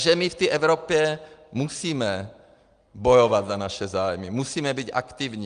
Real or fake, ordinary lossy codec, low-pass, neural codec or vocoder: real; Opus, 32 kbps; 9.9 kHz; none